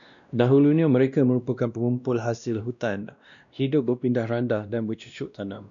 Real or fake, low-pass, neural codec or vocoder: fake; 7.2 kHz; codec, 16 kHz, 1 kbps, X-Codec, WavLM features, trained on Multilingual LibriSpeech